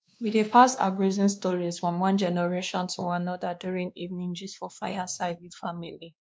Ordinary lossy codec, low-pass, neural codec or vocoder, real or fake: none; none; codec, 16 kHz, 2 kbps, X-Codec, WavLM features, trained on Multilingual LibriSpeech; fake